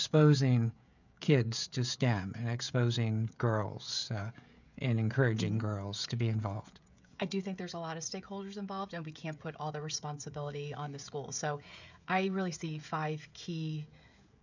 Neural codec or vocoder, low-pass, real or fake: codec, 16 kHz, 16 kbps, FreqCodec, smaller model; 7.2 kHz; fake